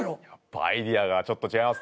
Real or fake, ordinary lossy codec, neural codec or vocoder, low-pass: real; none; none; none